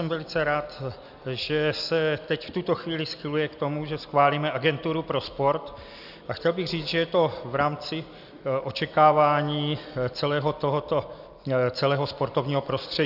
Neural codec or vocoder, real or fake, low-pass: none; real; 5.4 kHz